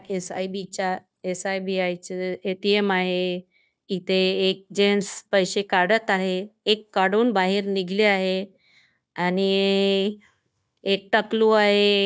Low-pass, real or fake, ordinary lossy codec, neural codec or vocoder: none; fake; none; codec, 16 kHz, 0.9 kbps, LongCat-Audio-Codec